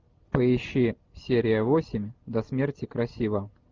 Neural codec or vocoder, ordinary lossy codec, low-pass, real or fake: none; Opus, 32 kbps; 7.2 kHz; real